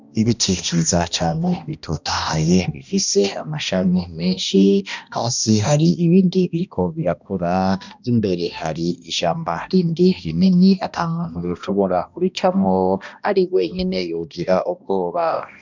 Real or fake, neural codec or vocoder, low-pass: fake; codec, 16 kHz, 1 kbps, X-Codec, HuBERT features, trained on balanced general audio; 7.2 kHz